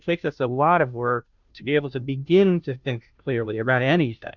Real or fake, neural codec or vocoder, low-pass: fake; codec, 16 kHz, 0.5 kbps, FunCodec, trained on Chinese and English, 25 frames a second; 7.2 kHz